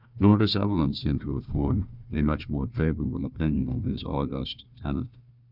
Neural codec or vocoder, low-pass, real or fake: codec, 16 kHz, 1 kbps, FunCodec, trained on Chinese and English, 50 frames a second; 5.4 kHz; fake